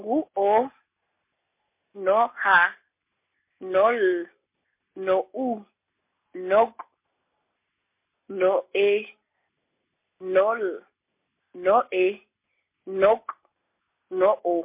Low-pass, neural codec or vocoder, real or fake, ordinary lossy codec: 3.6 kHz; none; real; MP3, 24 kbps